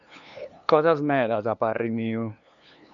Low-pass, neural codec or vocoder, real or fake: 7.2 kHz; codec, 16 kHz, 2 kbps, FunCodec, trained on LibriTTS, 25 frames a second; fake